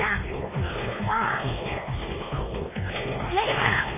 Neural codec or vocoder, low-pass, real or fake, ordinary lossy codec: codec, 16 kHz, 2 kbps, X-Codec, WavLM features, trained on Multilingual LibriSpeech; 3.6 kHz; fake; MP3, 16 kbps